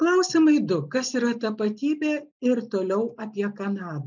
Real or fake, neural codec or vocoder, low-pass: real; none; 7.2 kHz